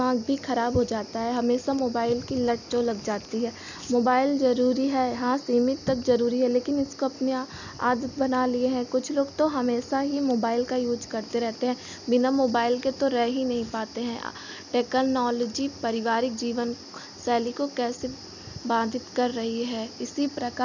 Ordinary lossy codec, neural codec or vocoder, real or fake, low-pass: none; none; real; 7.2 kHz